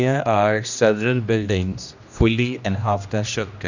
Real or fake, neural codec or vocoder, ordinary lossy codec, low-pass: fake; codec, 16 kHz, 1 kbps, X-Codec, HuBERT features, trained on general audio; none; 7.2 kHz